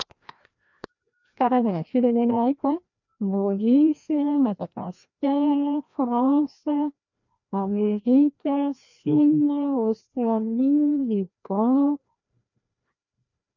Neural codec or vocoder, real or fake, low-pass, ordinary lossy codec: codec, 16 kHz, 1 kbps, FreqCodec, larger model; fake; 7.2 kHz; none